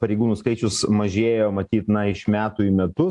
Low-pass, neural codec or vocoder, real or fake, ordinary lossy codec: 10.8 kHz; none; real; AAC, 64 kbps